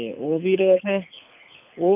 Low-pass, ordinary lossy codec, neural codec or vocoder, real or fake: 3.6 kHz; AAC, 32 kbps; codec, 24 kHz, 6 kbps, HILCodec; fake